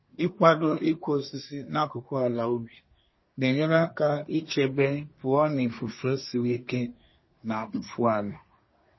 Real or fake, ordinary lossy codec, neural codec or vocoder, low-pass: fake; MP3, 24 kbps; codec, 24 kHz, 1 kbps, SNAC; 7.2 kHz